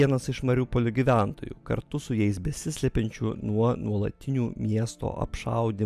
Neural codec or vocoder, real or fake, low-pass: none; real; 14.4 kHz